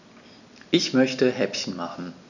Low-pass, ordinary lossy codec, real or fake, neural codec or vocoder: 7.2 kHz; none; real; none